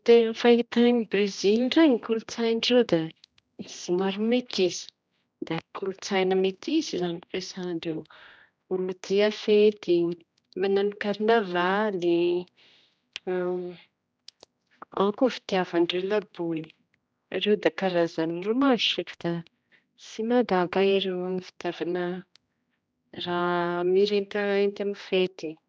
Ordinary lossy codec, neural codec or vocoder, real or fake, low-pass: none; codec, 16 kHz, 1 kbps, X-Codec, HuBERT features, trained on general audio; fake; none